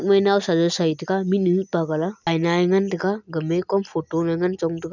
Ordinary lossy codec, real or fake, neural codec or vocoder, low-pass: none; real; none; 7.2 kHz